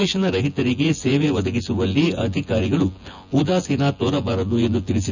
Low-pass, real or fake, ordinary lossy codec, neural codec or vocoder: 7.2 kHz; fake; none; vocoder, 24 kHz, 100 mel bands, Vocos